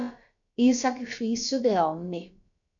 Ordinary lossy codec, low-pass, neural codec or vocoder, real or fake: MP3, 96 kbps; 7.2 kHz; codec, 16 kHz, about 1 kbps, DyCAST, with the encoder's durations; fake